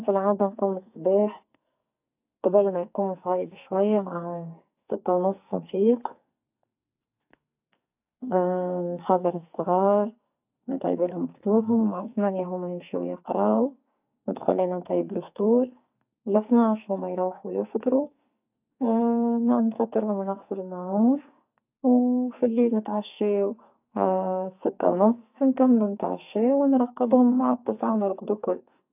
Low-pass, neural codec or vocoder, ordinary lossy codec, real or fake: 3.6 kHz; codec, 44.1 kHz, 2.6 kbps, SNAC; AAC, 32 kbps; fake